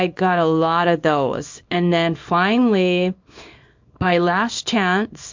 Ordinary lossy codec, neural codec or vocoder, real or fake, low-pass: MP3, 48 kbps; codec, 24 kHz, 0.9 kbps, WavTokenizer, small release; fake; 7.2 kHz